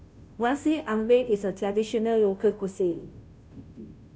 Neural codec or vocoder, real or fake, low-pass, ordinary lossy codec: codec, 16 kHz, 0.5 kbps, FunCodec, trained on Chinese and English, 25 frames a second; fake; none; none